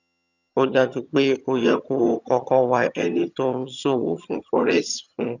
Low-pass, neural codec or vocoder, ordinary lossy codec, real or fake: 7.2 kHz; vocoder, 22.05 kHz, 80 mel bands, HiFi-GAN; none; fake